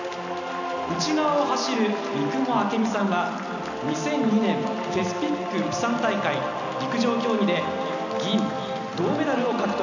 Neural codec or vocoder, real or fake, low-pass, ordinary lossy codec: none; real; 7.2 kHz; none